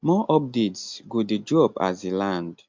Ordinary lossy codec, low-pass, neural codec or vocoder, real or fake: MP3, 64 kbps; 7.2 kHz; none; real